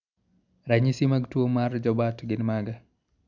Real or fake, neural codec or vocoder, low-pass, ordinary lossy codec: real; none; 7.2 kHz; none